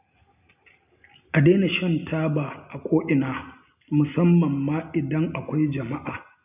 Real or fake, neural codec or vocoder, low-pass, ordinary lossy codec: real; none; 3.6 kHz; none